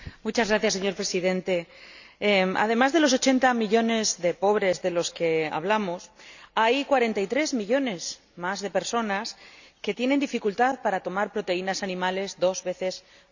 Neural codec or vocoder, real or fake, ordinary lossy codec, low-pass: none; real; none; 7.2 kHz